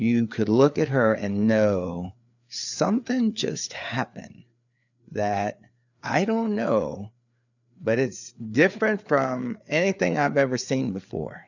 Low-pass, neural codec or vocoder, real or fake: 7.2 kHz; codec, 16 kHz, 4 kbps, FreqCodec, larger model; fake